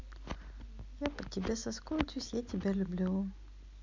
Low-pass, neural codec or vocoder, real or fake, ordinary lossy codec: 7.2 kHz; none; real; MP3, 64 kbps